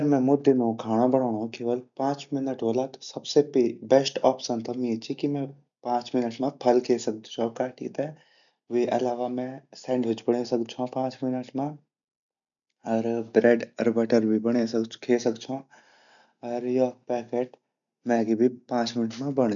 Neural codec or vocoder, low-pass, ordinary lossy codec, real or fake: none; 7.2 kHz; none; real